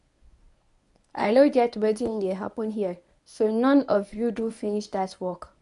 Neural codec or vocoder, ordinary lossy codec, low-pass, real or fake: codec, 24 kHz, 0.9 kbps, WavTokenizer, medium speech release version 1; AAC, 96 kbps; 10.8 kHz; fake